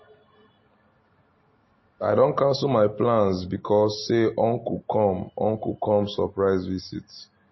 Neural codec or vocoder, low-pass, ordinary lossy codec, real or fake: none; 7.2 kHz; MP3, 24 kbps; real